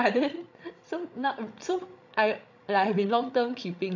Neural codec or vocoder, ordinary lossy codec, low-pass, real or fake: codec, 16 kHz, 16 kbps, FreqCodec, larger model; none; 7.2 kHz; fake